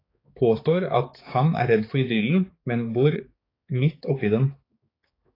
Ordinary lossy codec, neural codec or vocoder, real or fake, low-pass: AAC, 24 kbps; codec, 16 kHz, 4 kbps, X-Codec, HuBERT features, trained on balanced general audio; fake; 5.4 kHz